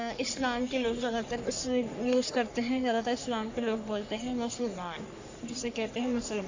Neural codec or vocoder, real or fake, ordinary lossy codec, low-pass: codec, 44.1 kHz, 3.4 kbps, Pupu-Codec; fake; AAC, 48 kbps; 7.2 kHz